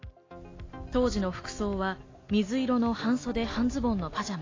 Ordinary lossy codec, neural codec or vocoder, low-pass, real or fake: AAC, 32 kbps; none; 7.2 kHz; real